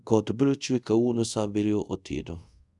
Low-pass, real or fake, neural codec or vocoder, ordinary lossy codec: 10.8 kHz; fake; codec, 24 kHz, 0.5 kbps, DualCodec; none